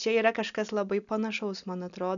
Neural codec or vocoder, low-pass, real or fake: none; 7.2 kHz; real